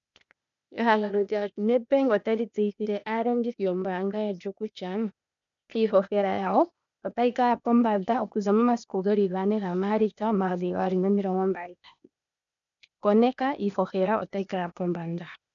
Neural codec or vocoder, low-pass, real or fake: codec, 16 kHz, 0.8 kbps, ZipCodec; 7.2 kHz; fake